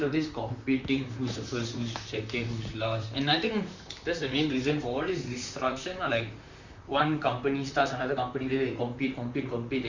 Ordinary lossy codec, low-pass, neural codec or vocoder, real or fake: Opus, 64 kbps; 7.2 kHz; vocoder, 44.1 kHz, 128 mel bands, Pupu-Vocoder; fake